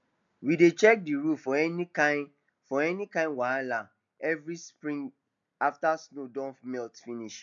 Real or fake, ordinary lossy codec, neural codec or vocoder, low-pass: real; none; none; 7.2 kHz